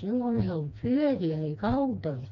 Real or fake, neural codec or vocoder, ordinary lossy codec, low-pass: fake; codec, 16 kHz, 2 kbps, FreqCodec, smaller model; none; 7.2 kHz